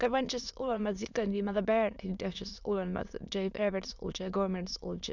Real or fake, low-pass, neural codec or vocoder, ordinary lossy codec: fake; 7.2 kHz; autoencoder, 22.05 kHz, a latent of 192 numbers a frame, VITS, trained on many speakers; none